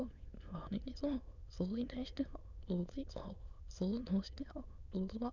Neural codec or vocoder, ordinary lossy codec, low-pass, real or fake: autoencoder, 22.05 kHz, a latent of 192 numbers a frame, VITS, trained on many speakers; none; 7.2 kHz; fake